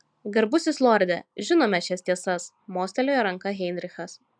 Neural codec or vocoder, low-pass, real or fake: none; 9.9 kHz; real